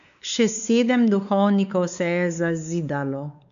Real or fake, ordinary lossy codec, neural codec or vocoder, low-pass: fake; none; codec, 16 kHz, 4 kbps, X-Codec, WavLM features, trained on Multilingual LibriSpeech; 7.2 kHz